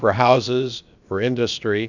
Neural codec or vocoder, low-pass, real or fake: codec, 16 kHz, about 1 kbps, DyCAST, with the encoder's durations; 7.2 kHz; fake